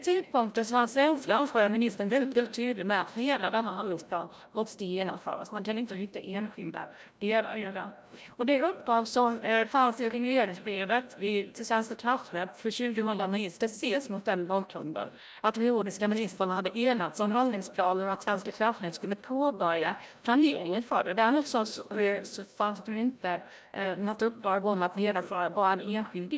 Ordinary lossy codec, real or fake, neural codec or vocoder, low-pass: none; fake; codec, 16 kHz, 0.5 kbps, FreqCodec, larger model; none